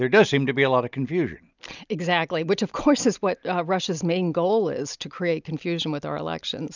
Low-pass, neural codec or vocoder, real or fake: 7.2 kHz; none; real